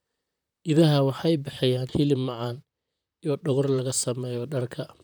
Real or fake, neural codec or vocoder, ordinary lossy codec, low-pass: fake; vocoder, 44.1 kHz, 128 mel bands, Pupu-Vocoder; none; none